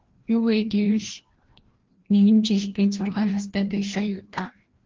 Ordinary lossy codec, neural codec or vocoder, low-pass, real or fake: Opus, 16 kbps; codec, 16 kHz, 1 kbps, FreqCodec, larger model; 7.2 kHz; fake